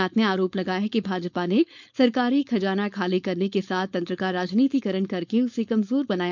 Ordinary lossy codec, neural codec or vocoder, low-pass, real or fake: none; codec, 16 kHz, 4.8 kbps, FACodec; 7.2 kHz; fake